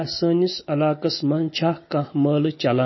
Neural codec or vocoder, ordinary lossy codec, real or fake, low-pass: none; MP3, 24 kbps; real; 7.2 kHz